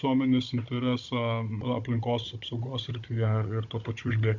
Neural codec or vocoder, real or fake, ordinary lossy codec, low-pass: codec, 16 kHz, 16 kbps, FunCodec, trained on Chinese and English, 50 frames a second; fake; MP3, 64 kbps; 7.2 kHz